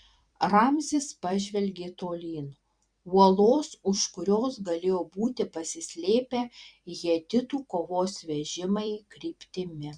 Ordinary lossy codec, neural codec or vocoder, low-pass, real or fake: Opus, 64 kbps; vocoder, 44.1 kHz, 128 mel bands every 256 samples, BigVGAN v2; 9.9 kHz; fake